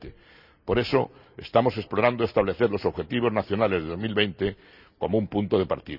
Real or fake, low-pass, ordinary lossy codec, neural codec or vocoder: real; 5.4 kHz; none; none